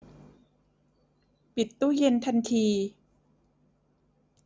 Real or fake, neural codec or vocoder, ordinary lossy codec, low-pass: real; none; none; none